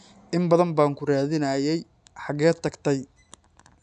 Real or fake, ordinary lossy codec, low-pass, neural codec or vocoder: real; none; 10.8 kHz; none